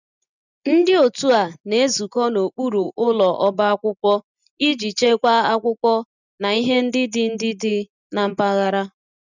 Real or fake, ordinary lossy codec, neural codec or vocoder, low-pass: real; none; none; 7.2 kHz